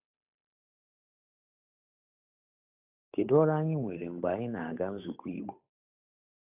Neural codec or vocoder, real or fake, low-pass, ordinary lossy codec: codec, 16 kHz, 8 kbps, FunCodec, trained on Chinese and English, 25 frames a second; fake; 3.6 kHz; AAC, 32 kbps